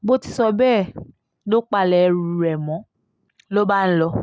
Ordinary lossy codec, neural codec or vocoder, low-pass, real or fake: none; none; none; real